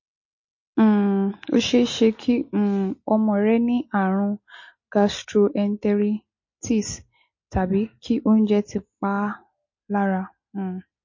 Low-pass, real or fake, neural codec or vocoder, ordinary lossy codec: 7.2 kHz; real; none; MP3, 32 kbps